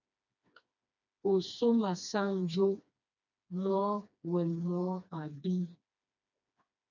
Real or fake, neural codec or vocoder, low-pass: fake; codec, 16 kHz, 2 kbps, FreqCodec, smaller model; 7.2 kHz